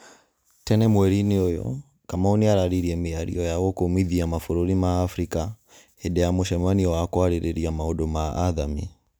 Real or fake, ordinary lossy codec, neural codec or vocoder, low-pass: real; none; none; none